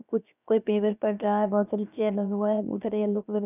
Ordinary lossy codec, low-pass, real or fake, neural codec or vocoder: none; 3.6 kHz; fake; codec, 16 kHz, about 1 kbps, DyCAST, with the encoder's durations